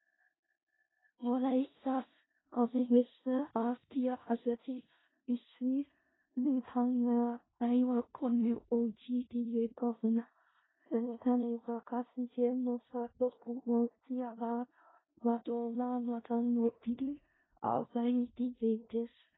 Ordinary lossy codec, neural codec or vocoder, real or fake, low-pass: AAC, 16 kbps; codec, 16 kHz in and 24 kHz out, 0.4 kbps, LongCat-Audio-Codec, four codebook decoder; fake; 7.2 kHz